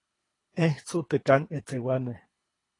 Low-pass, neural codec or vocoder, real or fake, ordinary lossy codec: 10.8 kHz; codec, 24 kHz, 3 kbps, HILCodec; fake; AAC, 32 kbps